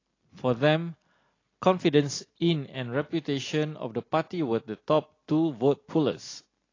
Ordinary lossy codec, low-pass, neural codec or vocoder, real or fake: AAC, 32 kbps; 7.2 kHz; none; real